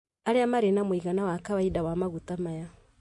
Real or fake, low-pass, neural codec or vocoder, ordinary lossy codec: real; 10.8 kHz; none; MP3, 48 kbps